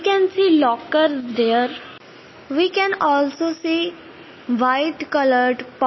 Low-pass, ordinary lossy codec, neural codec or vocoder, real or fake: 7.2 kHz; MP3, 24 kbps; none; real